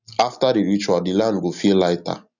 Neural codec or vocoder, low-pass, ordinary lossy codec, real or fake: none; 7.2 kHz; none; real